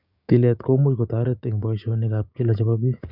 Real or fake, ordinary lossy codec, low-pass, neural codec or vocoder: fake; none; 5.4 kHz; codec, 16 kHz, 6 kbps, DAC